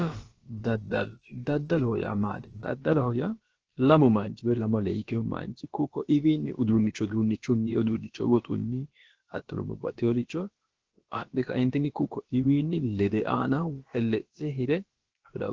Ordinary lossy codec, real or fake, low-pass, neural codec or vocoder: Opus, 16 kbps; fake; 7.2 kHz; codec, 16 kHz, about 1 kbps, DyCAST, with the encoder's durations